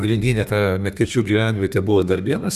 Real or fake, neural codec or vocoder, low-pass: fake; codec, 32 kHz, 1.9 kbps, SNAC; 14.4 kHz